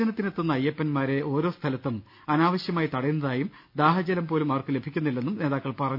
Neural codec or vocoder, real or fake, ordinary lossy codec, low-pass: none; real; none; 5.4 kHz